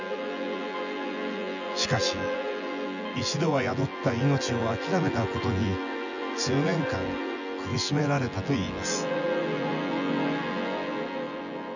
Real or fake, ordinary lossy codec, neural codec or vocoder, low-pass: fake; none; vocoder, 24 kHz, 100 mel bands, Vocos; 7.2 kHz